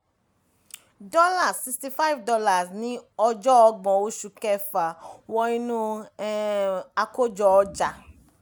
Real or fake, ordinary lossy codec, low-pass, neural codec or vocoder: real; none; none; none